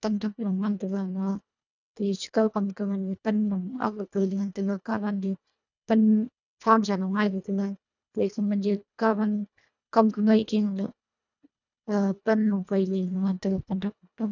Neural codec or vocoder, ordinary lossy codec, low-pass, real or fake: codec, 24 kHz, 1.5 kbps, HILCodec; none; 7.2 kHz; fake